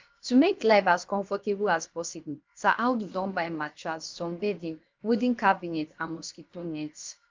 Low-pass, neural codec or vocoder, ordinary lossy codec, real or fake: 7.2 kHz; codec, 16 kHz, about 1 kbps, DyCAST, with the encoder's durations; Opus, 24 kbps; fake